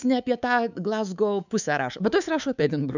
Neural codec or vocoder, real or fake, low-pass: codec, 24 kHz, 3.1 kbps, DualCodec; fake; 7.2 kHz